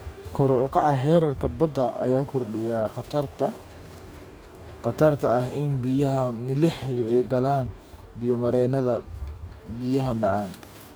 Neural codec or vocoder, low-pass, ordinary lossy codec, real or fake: codec, 44.1 kHz, 2.6 kbps, DAC; none; none; fake